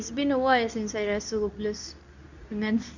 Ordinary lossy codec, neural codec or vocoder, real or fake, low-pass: none; codec, 24 kHz, 0.9 kbps, WavTokenizer, medium speech release version 2; fake; 7.2 kHz